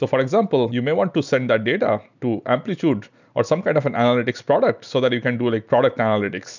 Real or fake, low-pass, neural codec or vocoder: real; 7.2 kHz; none